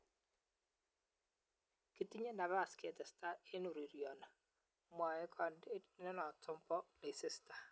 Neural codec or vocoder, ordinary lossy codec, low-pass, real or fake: none; none; none; real